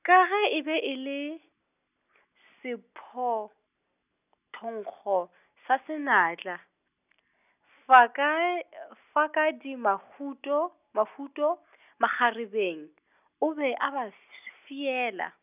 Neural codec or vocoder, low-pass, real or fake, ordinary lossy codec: none; 3.6 kHz; real; none